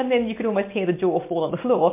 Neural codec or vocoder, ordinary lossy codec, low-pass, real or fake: none; MP3, 24 kbps; 3.6 kHz; real